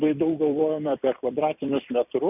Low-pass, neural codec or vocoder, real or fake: 3.6 kHz; vocoder, 44.1 kHz, 128 mel bands every 256 samples, BigVGAN v2; fake